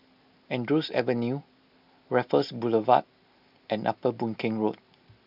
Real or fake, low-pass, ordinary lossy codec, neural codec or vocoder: real; 5.4 kHz; none; none